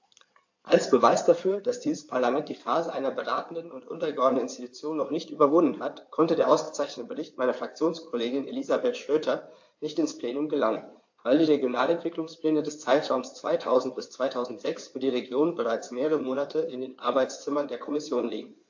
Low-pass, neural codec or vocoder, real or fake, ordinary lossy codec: 7.2 kHz; codec, 16 kHz in and 24 kHz out, 2.2 kbps, FireRedTTS-2 codec; fake; AAC, 48 kbps